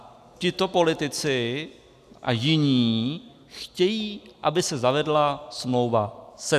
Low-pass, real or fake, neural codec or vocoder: 14.4 kHz; real; none